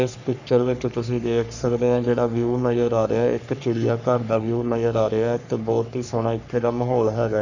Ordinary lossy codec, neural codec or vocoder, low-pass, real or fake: none; codec, 44.1 kHz, 3.4 kbps, Pupu-Codec; 7.2 kHz; fake